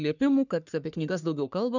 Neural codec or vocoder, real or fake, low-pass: codec, 44.1 kHz, 3.4 kbps, Pupu-Codec; fake; 7.2 kHz